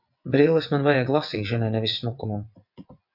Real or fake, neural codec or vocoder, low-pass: fake; vocoder, 22.05 kHz, 80 mel bands, WaveNeXt; 5.4 kHz